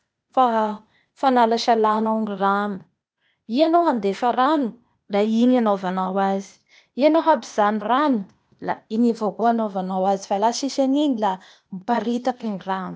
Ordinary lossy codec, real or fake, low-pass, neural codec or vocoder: none; fake; none; codec, 16 kHz, 0.8 kbps, ZipCodec